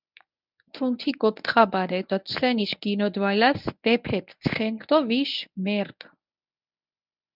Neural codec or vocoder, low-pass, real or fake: codec, 24 kHz, 0.9 kbps, WavTokenizer, medium speech release version 1; 5.4 kHz; fake